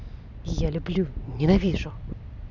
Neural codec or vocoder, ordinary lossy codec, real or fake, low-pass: none; none; real; 7.2 kHz